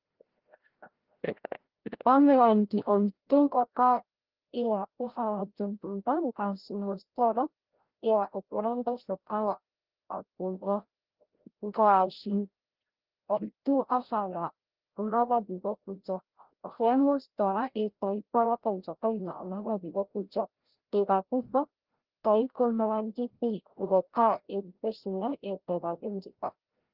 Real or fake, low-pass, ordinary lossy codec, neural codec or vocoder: fake; 5.4 kHz; Opus, 16 kbps; codec, 16 kHz, 0.5 kbps, FreqCodec, larger model